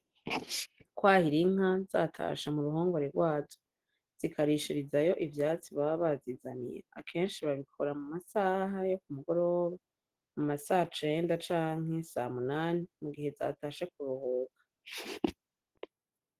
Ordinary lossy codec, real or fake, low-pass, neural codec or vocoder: Opus, 16 kbps; real; 14.4 kHz; none